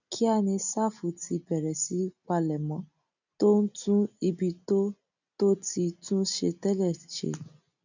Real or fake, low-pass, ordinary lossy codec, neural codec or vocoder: real; 7.2 kHz; none; none